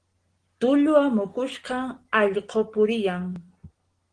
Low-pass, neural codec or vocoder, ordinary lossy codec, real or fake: 9.9 kHz; none; Opus, 16 kbps; real